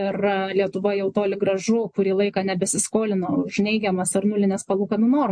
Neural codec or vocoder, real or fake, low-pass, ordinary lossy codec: none; real; 9.9 kHz; MP3, 48 kbps